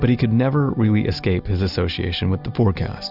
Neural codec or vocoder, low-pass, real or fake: none; 5.4 kHz; real